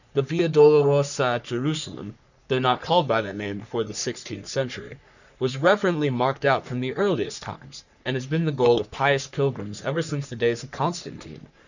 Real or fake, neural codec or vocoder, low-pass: fake; codec, 44.1 kHz, 3.4 kbps, Pupu-Codec; 7.2 kHz